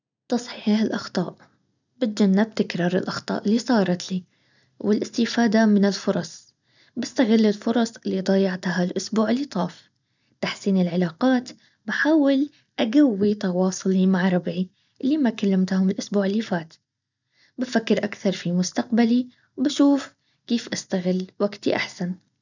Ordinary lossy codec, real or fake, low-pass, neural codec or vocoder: none; real; 7.2 kHz; none